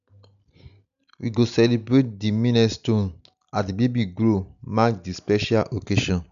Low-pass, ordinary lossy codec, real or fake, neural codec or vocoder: 7.2 kHz; none; real; none